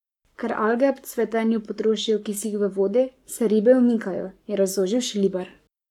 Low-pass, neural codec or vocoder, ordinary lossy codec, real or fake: 19.8 kHz; codec, 44.1 kHz, 7.8 kbps, Pupu-Codec; none; fake